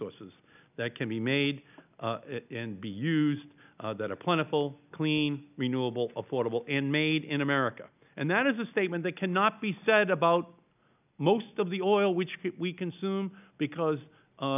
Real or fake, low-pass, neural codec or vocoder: real; 3.6 kHz; none